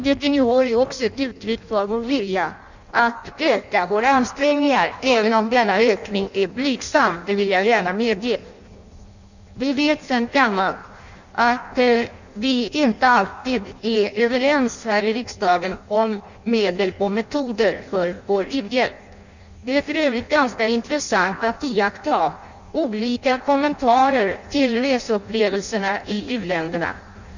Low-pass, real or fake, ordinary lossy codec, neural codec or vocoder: 7.2 kHz; fake; none; codec, 16 kHz in and 24 kHz out, 0.6 kbps, FireRedTTS-2 codec